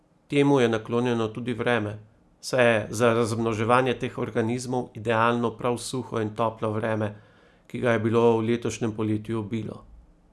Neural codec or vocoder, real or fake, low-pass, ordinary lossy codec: none; real; none; none